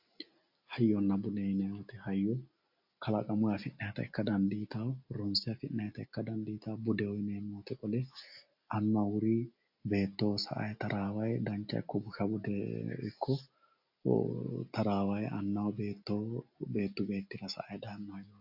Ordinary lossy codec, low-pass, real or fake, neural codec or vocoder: AAC, 48 kbps; 5.4 kHz; real; none